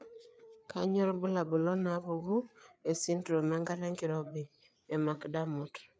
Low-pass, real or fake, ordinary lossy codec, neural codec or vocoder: none; fake; none; codec, 16 kHz, 4 kbps, FreqCodec, larger model